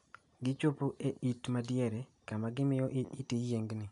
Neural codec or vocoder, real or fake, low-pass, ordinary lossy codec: none; real; 10.8 kHz; none